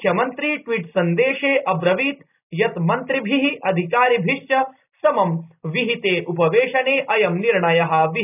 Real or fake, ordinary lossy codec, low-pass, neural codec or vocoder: real; none; 3.6 kHz; none